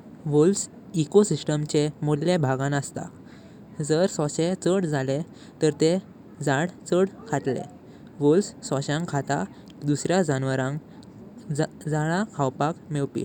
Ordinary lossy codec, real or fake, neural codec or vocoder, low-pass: none; real; none; 19.8 kHz